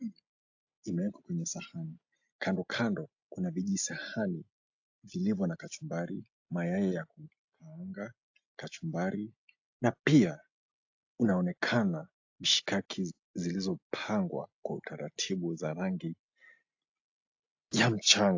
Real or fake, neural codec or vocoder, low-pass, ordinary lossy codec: real; none; 7.2 kHz; AAC, 48 kbps